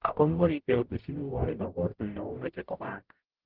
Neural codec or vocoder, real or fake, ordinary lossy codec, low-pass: codec, 44.1 kHz, 0.9 kbps, DAC; fake; Opus, 16 kbps; 5.4 kHz